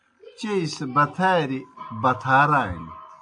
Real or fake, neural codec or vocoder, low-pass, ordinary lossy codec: real; none; 9.9 kHz; AAC, 64 kbps